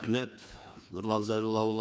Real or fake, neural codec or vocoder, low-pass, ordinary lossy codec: fake; codec, 16 kHz, 2 kbps, FreqCodec, larger model; none; none